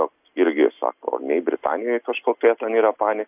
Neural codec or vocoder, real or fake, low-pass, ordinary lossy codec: none; real; 3.6 kHz; AAC, 32 kbps